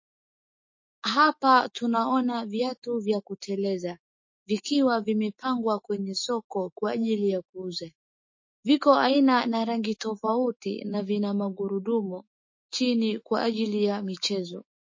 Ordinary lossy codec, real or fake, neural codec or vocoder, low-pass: MP3, 32 kbps; fake; autoencoder, 48 kHz, 128 numbers a frame, DAC-VAE, trained on Japanese speech; 7.2 kHz